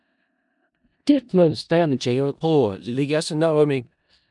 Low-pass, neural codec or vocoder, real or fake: 10.8 kHz; codec, 16 kHz in and 24 kHz out, 0.4 kbps, LongCat-Audio-Codec, four codebook decoder; fake